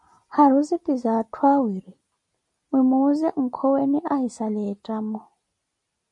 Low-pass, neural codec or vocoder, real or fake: 10.8 kHz; none; real